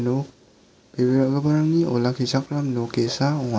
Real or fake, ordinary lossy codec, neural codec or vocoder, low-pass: real; none; none; none